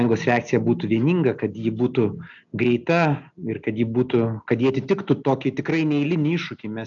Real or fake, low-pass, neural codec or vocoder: real; 7.2 kHz; none